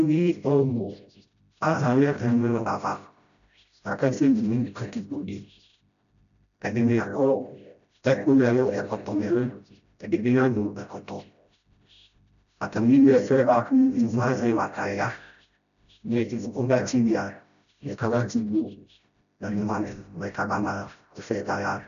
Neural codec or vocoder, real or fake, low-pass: codec, 16 kHz, 0.5 kbps, FreqCodec, smaller model; fake; 7.2 kHz